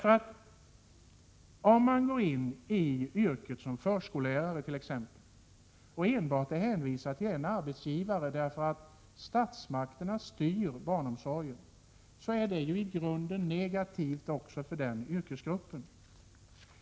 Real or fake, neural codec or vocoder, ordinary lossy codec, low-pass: real; none; none; none